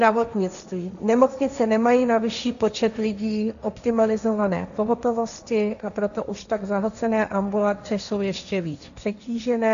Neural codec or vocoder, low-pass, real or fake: codec, 16 kHz, 1.1 kbps, Voila-Tokenizer; 7.2 kHz; fake